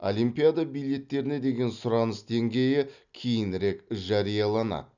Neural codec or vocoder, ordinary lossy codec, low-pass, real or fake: none; none; 7.2 kHz; real